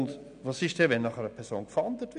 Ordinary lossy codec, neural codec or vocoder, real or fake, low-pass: none; none; real; 9.9 kHz